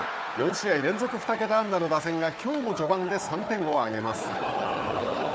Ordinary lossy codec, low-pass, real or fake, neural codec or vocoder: none; none; fake; codec, 16 kHz, 4 kbps, FunCodec, trained on Chinese and English, 50 frames a second